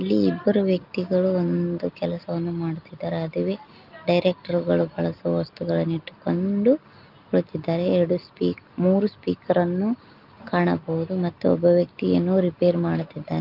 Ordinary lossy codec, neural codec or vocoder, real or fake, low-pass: Opus, 24 kbps; none; real; 5.4 kHz